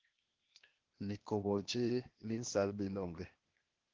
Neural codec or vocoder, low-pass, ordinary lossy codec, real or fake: codec, 16 kHz, 0.8 kbps, ZipCodec; 7.2 kHz; Opus, 16 kbps; fake